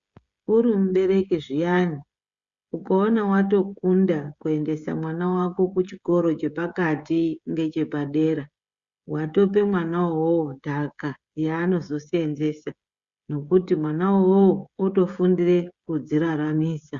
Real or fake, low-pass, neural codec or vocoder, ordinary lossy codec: fake; 7.2 kHz; codec, 16 kHz, 16 kbps, FreqCodec, smaller model; Opus, 64 kbps